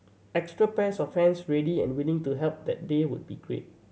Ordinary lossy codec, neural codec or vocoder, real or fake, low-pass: none; none; real; none